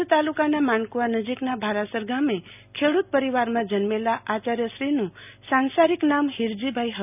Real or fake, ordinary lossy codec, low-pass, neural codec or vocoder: real; none; 3.6 kHz; none